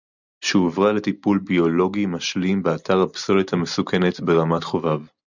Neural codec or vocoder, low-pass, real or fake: none; 7.2 kHz; real